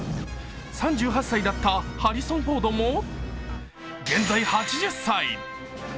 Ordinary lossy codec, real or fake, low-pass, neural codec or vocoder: none; real; none; none